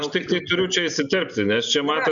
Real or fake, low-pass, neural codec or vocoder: real; 7.2 kHz; none